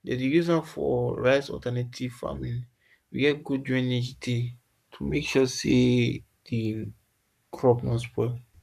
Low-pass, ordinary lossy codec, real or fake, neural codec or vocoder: 14.4 kHz; none; fake; codec, 44.1 kHz, 7.8 kbps, Pupu-Codec